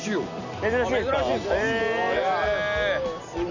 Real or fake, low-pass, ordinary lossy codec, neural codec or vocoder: real; 7.2 kHz; none; none